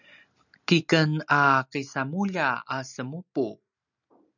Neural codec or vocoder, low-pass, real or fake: none; 7.2 kHz; real